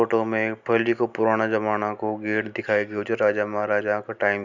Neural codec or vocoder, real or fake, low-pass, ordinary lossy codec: none; real; 7.2 kHz; none